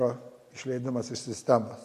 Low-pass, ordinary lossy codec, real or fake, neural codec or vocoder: 14.4 kHz; AAC, 64 kbps; real; none